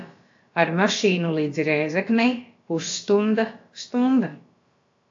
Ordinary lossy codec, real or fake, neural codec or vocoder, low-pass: AAC, 48 kbps; fake; codec, 16 kHz, about 1 kbps, DyCAST, with the encoder's durations; 7.2 kHz